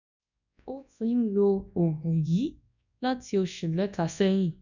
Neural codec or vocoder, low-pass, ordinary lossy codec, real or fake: codec, 24 kHz, 0.9 kbps, WavTokenizer, large speech release; 7.2 kHz; none; fake